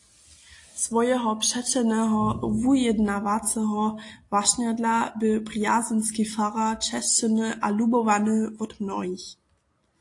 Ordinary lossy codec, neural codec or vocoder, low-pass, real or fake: AAC, 48 kbps; none; 10.8 kHz; real